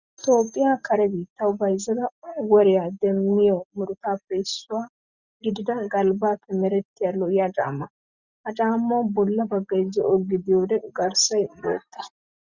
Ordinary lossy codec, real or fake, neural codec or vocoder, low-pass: Opus, 64 kbps; real; none; 7.2 kHz